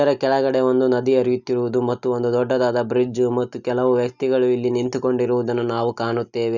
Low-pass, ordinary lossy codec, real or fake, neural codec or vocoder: 7.2 kHz; none; real; none